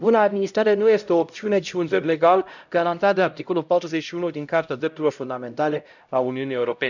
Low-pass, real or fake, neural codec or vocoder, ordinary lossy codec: 7.2 kHz; fake; codec, 16 kHz, 0.5 kbps, X-Codec, HuBERT features, trained on LibriSpeech; none